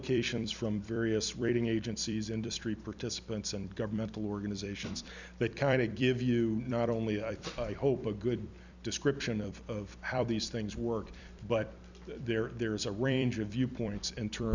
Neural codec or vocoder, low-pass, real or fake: vocoder, 44.1 kHz, 128 mel bands every 256 samples, BigVGAN v2; 7.2 kHz; fake